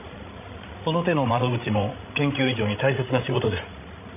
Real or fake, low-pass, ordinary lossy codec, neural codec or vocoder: fake; 3.6 kHz; none; codec, 16 kHz, 16 kbps, FreqCodec, larger model